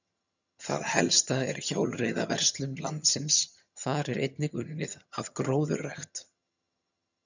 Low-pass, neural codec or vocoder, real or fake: 7.2 kHz; vocoder, 22.05 kHz, 80 mel bands, HiFi-GAN; fake